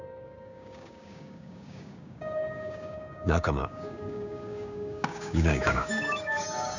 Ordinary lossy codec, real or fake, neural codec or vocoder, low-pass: none; fake; codec, 16 kHz, 6 kbps, DAC; 7.2 kHz